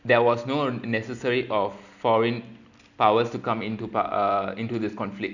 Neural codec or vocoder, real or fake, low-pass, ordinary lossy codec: none; real; 7.2 kHz; none